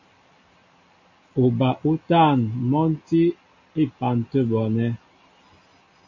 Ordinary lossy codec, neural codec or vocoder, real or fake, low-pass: AAC, 48 kbps; none; real; 7.2 kHz